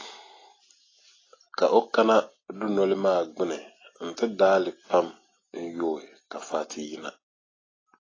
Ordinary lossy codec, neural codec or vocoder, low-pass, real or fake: AAC, 32 kbps; none; 7.2 kHz; real